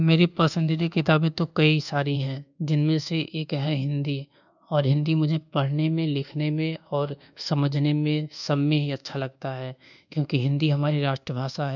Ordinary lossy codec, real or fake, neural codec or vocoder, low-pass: none; fake; codec, 24 kHz, 1.2 kbps, DualCodec; 7.2 kHz